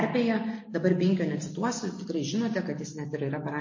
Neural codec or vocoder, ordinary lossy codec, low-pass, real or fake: none; MP3, 32 kbps; 7.2 kHz; real